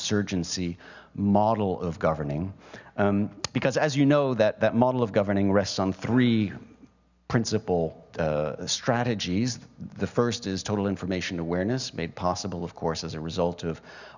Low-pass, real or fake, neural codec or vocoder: 7.2 kHz; real; none